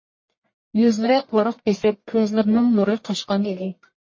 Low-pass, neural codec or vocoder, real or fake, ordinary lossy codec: 7.2 kHz; codec, 44.1 kHz, 1.7 kbps, Pupu-Codec; fake; MP3, 32 kbps